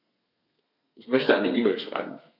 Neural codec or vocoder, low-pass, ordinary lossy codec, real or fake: codec, 32 kHz, 1.9 kbps, SNAC; 5.4 kHz; none; fake